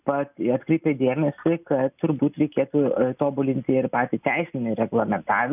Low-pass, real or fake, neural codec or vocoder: 3.6 kHz; real; none